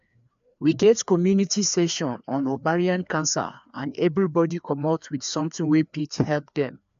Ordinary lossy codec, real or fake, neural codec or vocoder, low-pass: AAC, 96 kbps; fake; codec, 16 kHz, 2 kbps, FreqCodec, larger model; 7.2 kHz